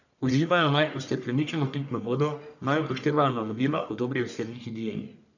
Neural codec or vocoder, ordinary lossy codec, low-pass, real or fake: codec, 44.1 kHz, 1.7 kbps, Pupu-Codec; none; 7.2 kHz; fake